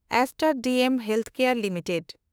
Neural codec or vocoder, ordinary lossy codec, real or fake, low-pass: autoencoder, 48 kHz, 32 numbers a frame, DAC-VAE, trained on Japanese speech; none; fake; none